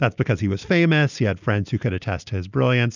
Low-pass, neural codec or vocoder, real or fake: 7.2 kHz; none; real